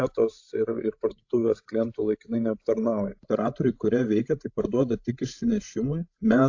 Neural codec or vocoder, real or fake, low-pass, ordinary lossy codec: codec, 16 kHz, 16 kbps, FreqCodec, larger model; fake; 7.2 kHz; AAC, 48 kbps